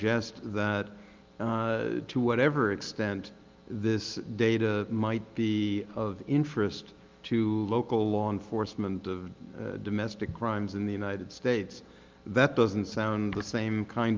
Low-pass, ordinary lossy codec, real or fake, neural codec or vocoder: 7.2 kHz; Opus, 24 kbps; real; none